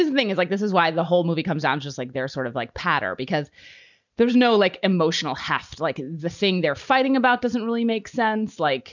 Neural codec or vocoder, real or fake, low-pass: none; real; 7.2 kHz